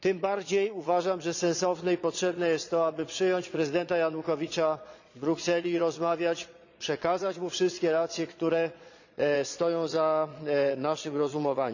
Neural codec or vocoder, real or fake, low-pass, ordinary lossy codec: vocoder, 22.05 kHz, 80 mel bands, Vocos; fake; 7.2 kHz; none